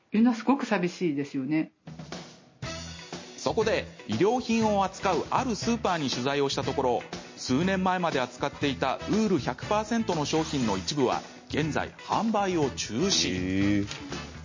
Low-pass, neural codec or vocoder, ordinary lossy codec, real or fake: 7.2 kHz; none; MP3, 32 kbps; real